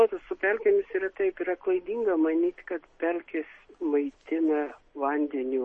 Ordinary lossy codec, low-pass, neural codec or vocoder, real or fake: MP3, 32 kbps; 10.8 kHz; none; real